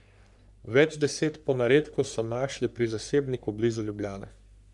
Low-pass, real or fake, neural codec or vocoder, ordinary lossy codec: 10.8 kHz; fake; codec, 44.1 kHz, 3.4 kbps, Pupu-Codec; none